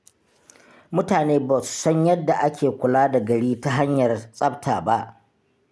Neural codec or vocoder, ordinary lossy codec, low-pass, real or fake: none; none; none; real